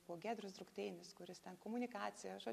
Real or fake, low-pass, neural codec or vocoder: real; 14.4 kHz; none